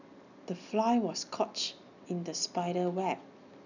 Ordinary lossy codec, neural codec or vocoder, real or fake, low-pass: none; none; real; 7.2 kHz